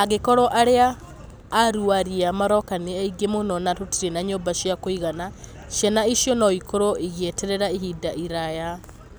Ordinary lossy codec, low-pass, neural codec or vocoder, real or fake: none; none; none; real